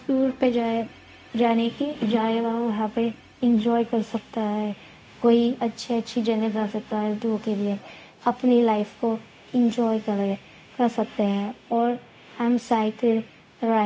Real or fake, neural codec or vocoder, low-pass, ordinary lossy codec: fake; codec, 16 kHz, 0.4 kbps, LongCat-Audio-Codec; none; none